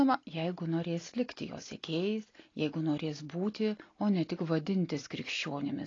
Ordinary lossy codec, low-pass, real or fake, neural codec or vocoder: AAC, 32 kbps; 7.2 kHz; real; none